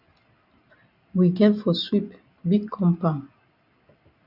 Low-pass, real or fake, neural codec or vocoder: 5.4 kHz; real; none